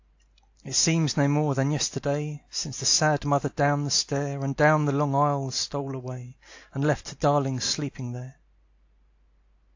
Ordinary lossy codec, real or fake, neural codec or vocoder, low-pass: AAC, 48 kbps; real; none; 7.2 kHz